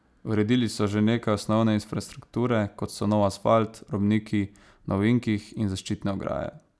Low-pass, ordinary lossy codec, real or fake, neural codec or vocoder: none; none; real; none